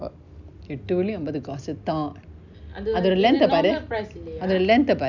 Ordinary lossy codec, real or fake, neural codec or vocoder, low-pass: none; real; none; 7.2 kHz